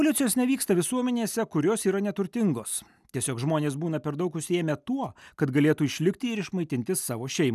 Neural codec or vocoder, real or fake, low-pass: none; real; 14.4 kHz